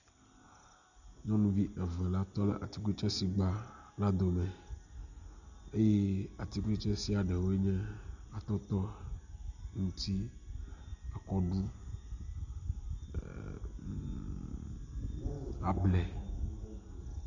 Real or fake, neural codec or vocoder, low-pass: real; none; 7.2 kHz